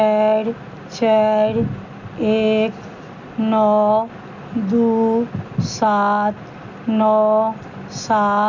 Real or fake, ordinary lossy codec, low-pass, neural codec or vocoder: real; none; 7.2 kHz; none